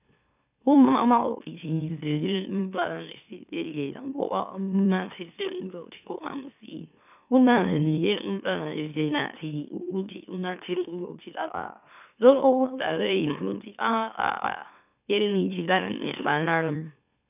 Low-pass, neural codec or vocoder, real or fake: 3.6 kHz; autoencoder, 44.1 kHz, a latent of 192 numbers a frame, MeloTTS; fake